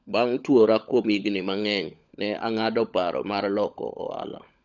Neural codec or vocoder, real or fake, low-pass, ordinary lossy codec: codec, 16 kHz, 16 kbps, FunCodec, trained on LibriTTS, 50 frames a second; fake; 7.2 kHz; none